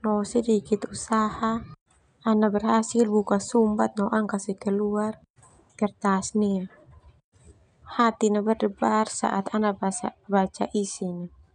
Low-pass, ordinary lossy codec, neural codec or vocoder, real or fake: 9.9 kHz; none; none; real